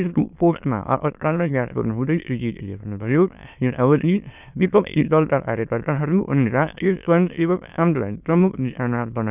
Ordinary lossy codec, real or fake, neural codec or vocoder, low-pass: none; fake; autoencoder, 22.05 kHz, a latent of 192 numbers a frame, VITS, trained on many speakers; 3.6 kHz